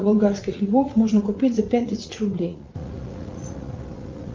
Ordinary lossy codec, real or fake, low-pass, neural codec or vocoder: Opus, 32 kbps; fake; 7.2 kHz; vocoder, 44.1 kHz, 80 mel bands, Vocos